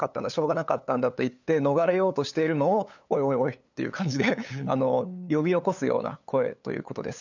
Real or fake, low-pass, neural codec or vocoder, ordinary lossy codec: fake; 7.2 kHz; codec, 16 kHz, 8 kbps, FunCodec, trained on LibriTTS, 25 frames a second; none